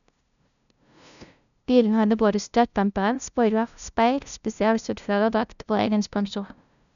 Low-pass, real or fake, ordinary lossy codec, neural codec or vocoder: 7.2 kHz; fake; none; codec, 16 kHz, 0.5 kbps, FunCodec, trained on LibriTTS, 25 frames a second